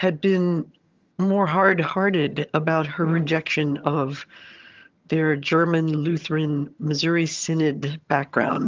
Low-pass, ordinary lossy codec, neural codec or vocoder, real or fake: 7.2 kHz; Opus, 32 kbps; vocoder, 22.05 kHz, 80 mel bands, HiFi-GAN; fake